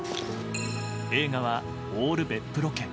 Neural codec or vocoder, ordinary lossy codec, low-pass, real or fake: none; none; none; real